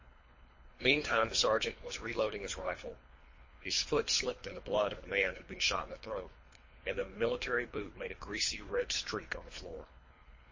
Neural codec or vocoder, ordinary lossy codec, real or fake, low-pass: codec, 24 kHz, 3 kbps, HILCodec; MP3, 32 kbps; fake; 7.2 kHz